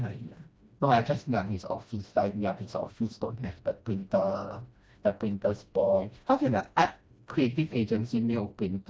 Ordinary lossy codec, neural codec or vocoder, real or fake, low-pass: none; codec, 16 kHz, 1 kbps, FreqCodec, smaller model; fake; none